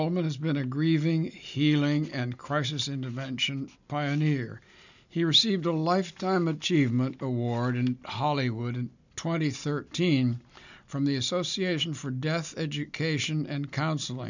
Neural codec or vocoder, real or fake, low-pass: none; real; 7.2 kHz